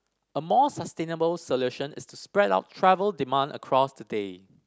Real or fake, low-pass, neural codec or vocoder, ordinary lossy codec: real; none; none; none